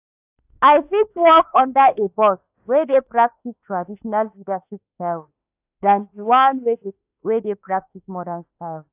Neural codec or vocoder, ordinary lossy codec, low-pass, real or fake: autoencoder, 48 kHz, 32 numbers a frame, DAC-VAE, trained on Japanese speech; AAC, 32 kbps; 3.6 kHz; fake